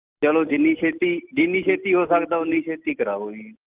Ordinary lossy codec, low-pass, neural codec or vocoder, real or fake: Opus, 32 kbps; 3.6 kHz; none; real